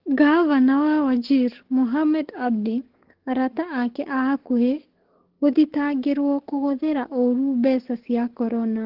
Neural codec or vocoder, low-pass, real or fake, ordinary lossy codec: codec, 44.1 kHz, 7.8 kbps, DAC; 5.4 kHz; fake; Opus, 16 kbps